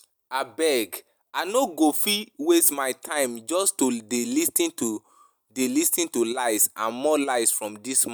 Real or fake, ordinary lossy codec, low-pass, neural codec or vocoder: real; none; none; none